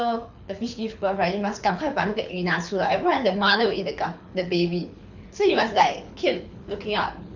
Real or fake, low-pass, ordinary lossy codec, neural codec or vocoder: fake; 7.2 kHz; none; codec, 24 kHz, 6 kbps, HILCodec